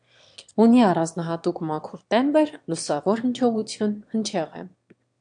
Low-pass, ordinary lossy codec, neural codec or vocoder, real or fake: 9.9 kHz; AAC, 64 kbps; autoencoder, 22.05 kHz, a latent of 192 numbers a frame, VITS, trained on one speaker; fake